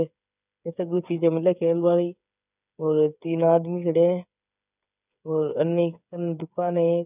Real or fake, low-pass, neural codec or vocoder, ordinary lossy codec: fake; 3.6 kHz; codec, 16 kHz, 8 kbps, FreqCodec, smaller model; none